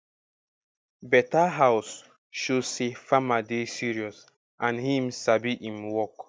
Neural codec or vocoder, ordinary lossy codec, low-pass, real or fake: none; none; none; real